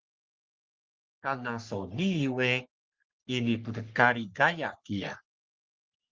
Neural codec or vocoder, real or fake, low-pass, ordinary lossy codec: codec, 44.1 kHz, 3.4 kbps, Pupu-Codec; fake; 7.2 kHz; Opus, 32 kbps